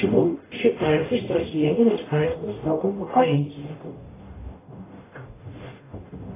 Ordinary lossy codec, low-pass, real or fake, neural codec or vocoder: AAC, 16 kbps; 3.6 kHz; fake; codec, 44.1 kHz, 0.9 kbps, DAC